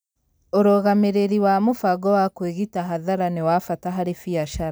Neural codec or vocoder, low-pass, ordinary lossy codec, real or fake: none; none; none; real